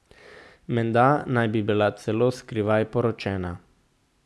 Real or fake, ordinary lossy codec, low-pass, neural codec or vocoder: real; none; none; none